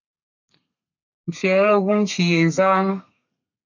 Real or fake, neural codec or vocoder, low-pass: fake; codec, 32 kHz, 1.9 kbps, SNAC; 7.2 kHz